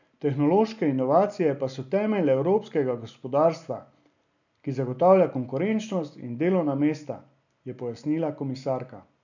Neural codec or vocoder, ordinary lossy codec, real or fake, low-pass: none; none; real; 7.2 kHz